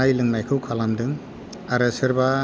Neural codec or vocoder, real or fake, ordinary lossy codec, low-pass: none; real; none; none